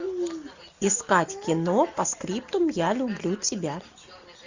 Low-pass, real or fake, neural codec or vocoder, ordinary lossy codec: 7.2 kHz; real; none; Opus, 64 kbps